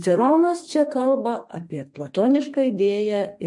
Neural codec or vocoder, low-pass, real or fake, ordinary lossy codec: codec, 32 kHz, 1.9 kbps, SNAC; 10.8 kHz; fake; MP3, 48 kbps